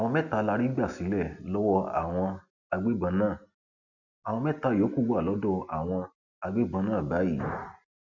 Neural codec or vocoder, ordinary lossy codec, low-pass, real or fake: none; none; 7.2 kHz; real